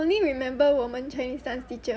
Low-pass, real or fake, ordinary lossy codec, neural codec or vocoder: none; real; none; none